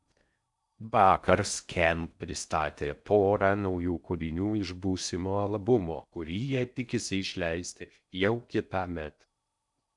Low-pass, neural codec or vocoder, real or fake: 10.8 kHz; codec, 16 kHz in and 24 kHz out, 0.6 kbps, FocalCodec, streaming, 4096 codes; fake